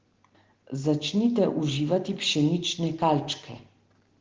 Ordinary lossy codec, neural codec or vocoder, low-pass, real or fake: Opus, 16 kbps; none; 7.2 kHz; real